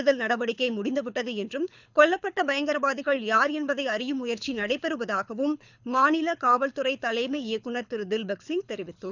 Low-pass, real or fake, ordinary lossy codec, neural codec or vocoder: 7.2 kHz; fake; none; codec, 24 kHz, 6 kbps, HILCodec